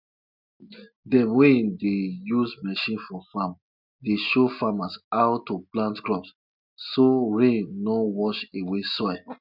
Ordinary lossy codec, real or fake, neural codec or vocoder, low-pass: none; real; none; 5.4 kHz